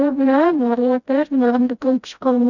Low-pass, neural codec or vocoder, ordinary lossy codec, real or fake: 7.2 kHz; codec, 16 kHz, 0.5 kbps, FreqCodec, smaller model; none; fake